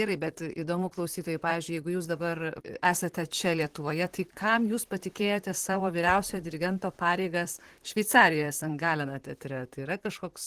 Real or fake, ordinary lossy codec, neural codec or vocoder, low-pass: fake; Opus, 16 kbps; vocoder, 44.1 kHz, 128 mel bands, Pupu-Vocoder; 14.4 kHz